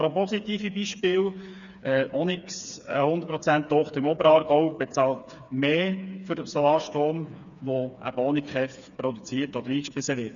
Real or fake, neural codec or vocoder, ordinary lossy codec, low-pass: fake; codec, 16 kHz, 4 kbps, FreqCodec, smaller model; none; 7.2 kHz